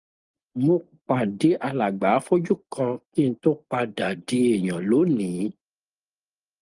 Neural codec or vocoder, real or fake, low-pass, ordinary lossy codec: none; real; 10.8 kHz; Opus, 32 kbps